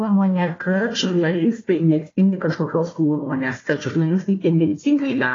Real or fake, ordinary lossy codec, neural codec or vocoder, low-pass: fake; AAC, 32 kbps; codec, 16 kHz, 1 kbps, FunCodec, trained on Chinese and English, 50 frames a second; 7.2 kHz